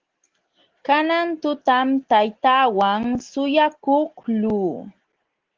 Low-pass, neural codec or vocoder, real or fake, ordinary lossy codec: 7.2 kHz; none; real; Opus, 16 kbps